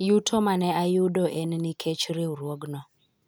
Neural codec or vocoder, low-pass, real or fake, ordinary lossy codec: none; none; real; none